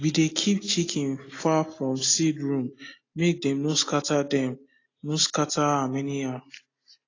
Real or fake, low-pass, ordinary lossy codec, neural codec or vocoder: real; 7.2 kHz; AAC, 32 kbps; none